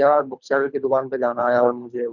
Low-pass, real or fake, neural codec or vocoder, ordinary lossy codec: 7.2 kHz; fake; codec, 24 kHz, 3 kbps, HILCodec; none